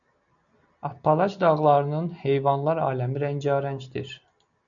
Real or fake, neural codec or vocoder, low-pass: real; none; 7.2 kHz